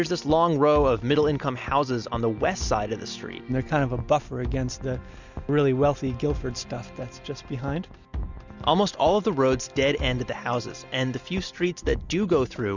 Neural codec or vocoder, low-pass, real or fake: none; 7.2 kHz; real